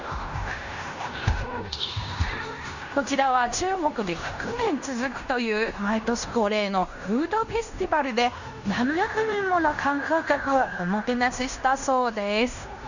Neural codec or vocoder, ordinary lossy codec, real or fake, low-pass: codec, 16 kHz in and 24 kHz out, 0.9 kbps, LongCat-Audio-Codec, fine tuned four codebook decoder; none; fake; 7.2 kHz